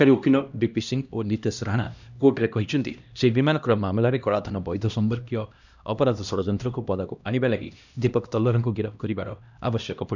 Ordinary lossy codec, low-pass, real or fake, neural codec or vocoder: none; 7.2 kHz; fake; codec, 16 kHz, 1 kbps, X-Codec, HuBERT features, trained on LibriSpeech